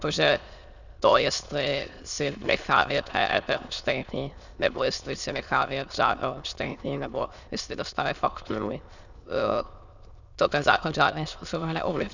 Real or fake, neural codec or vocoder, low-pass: fake; autoencoder, 22.05 kHz, a latent of 192 numbers a frame, VITS, trained on many speakers; 7.2 kHz